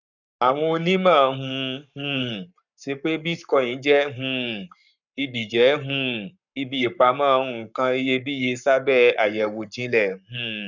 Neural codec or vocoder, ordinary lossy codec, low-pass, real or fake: codec, 44.1 kHz, 7.8 kbps, Pupu-Codec; none; 7.2 kHz; fake